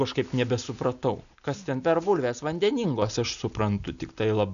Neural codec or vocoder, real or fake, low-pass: none; real; 7.2 kHz